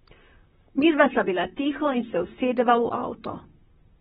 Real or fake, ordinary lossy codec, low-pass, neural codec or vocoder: fake; AAC, 16 kbps; 19.8 kHz; vocoder, 44.1 kHz, 128 mel bands, Pupu-Vocoder